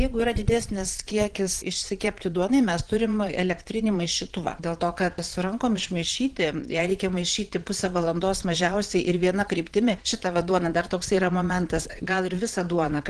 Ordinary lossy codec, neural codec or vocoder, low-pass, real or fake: Opus, 16 kbps; vocoder, 22.05 kHz, 80 mel bands, Vocos; 9.9 kHz; fake